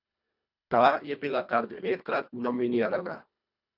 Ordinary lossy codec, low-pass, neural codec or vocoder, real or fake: none; 5.4 kHz; codec, 24 kHz, 1.5 kbps, HILCodec; fake